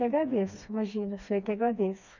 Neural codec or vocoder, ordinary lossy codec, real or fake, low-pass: codec, 16 kHz, 2 kbps, FreqCodec, smaller model; none; fake; 7.2 kHz